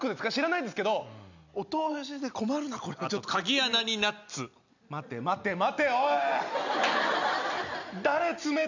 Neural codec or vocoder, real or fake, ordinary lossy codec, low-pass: none; real; none; 7.2 kHz